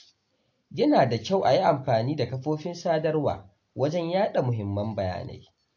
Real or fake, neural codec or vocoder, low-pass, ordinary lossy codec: real; none; 7.2 kHz; AAC, 48 kbps